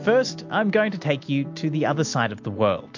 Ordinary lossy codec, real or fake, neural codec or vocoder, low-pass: MP3, 48 kbps; real; none; 7.2 kHz